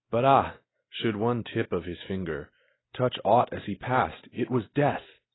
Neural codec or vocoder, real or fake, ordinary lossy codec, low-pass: none; real; AAC, 16 kbps; 7.2 kHz